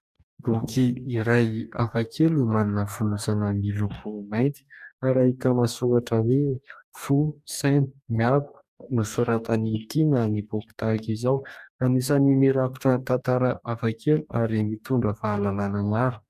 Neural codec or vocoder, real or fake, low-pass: codec, 44.1 kHz, 2.6 kbps, DAC; fake; 14.4 kHz